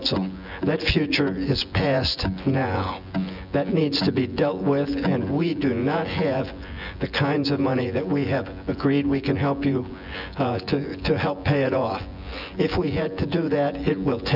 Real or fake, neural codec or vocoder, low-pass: fake; vocoder, 24 kHz, 100 mel bands, Vocos; 5.4 kHz